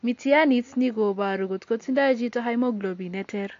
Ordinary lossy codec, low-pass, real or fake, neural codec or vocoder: AAC, 48 kbps; 7.2 kHz; real; none